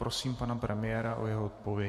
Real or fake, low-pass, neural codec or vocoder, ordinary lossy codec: fake; 14.4 kHz; vocoder, 48 kHz, 128 mel bands, Vocos; MP3, 64 kbps